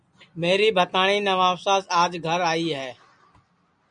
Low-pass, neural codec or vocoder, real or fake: 9.9 kHz; none; real